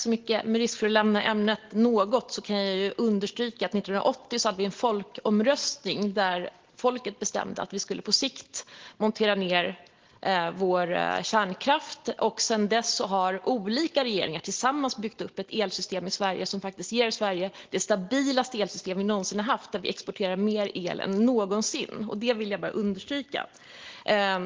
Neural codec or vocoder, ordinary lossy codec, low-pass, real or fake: none; Opus, 16 kbps; 7.2 kHz; real